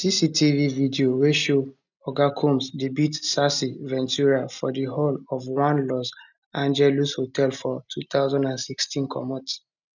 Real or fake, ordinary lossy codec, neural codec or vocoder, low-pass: real; none; none; 7.2 kHz